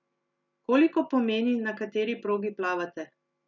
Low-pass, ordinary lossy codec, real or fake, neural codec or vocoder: 7.2 kHz; none; real; none